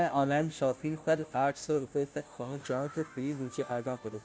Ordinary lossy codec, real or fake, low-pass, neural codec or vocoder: none; fake; none; codec, 16 kHz, 0.5 kbps, FunCodec, trained on Chinese and English, 25 frames a second